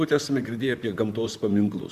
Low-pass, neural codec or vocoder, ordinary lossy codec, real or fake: 14.4 kHz; vocoder, 44.1 kHz, 128 mel bands, Pupu-Vocoder; Opus, 64 kbps; fake